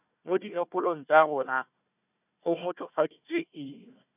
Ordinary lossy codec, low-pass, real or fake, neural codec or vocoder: none; 3.6 kHz; fake; codec, 16 kHz, 1 kbps, FunCodec, trained on Chinese and English, 50 frames a second